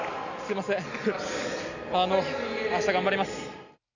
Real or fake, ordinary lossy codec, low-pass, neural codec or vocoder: real; none; 7.2 kHz; none